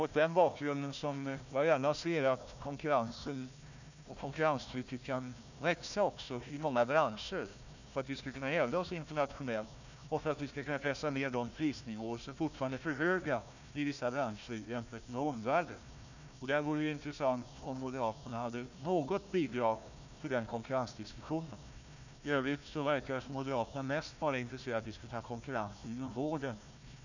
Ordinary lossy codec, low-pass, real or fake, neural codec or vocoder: none; 7.2 kHz; fake; codec, 16 kHz, 1 kbps, FunCodec, trained on Chinese and English, 50 frames a second